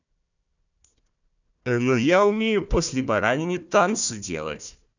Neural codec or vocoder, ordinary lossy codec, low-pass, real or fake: codec, 16 kHz, 1 kbps, FunCodec, trained on Chinese and English, 50 frames a second; none; 7.2 kHz; fake